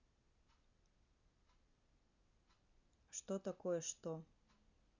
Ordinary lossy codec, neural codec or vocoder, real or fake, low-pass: none; none; real; 7.2 kHz